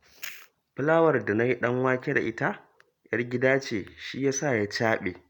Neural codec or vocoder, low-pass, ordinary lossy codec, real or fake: none; 19.8 kHz; none; real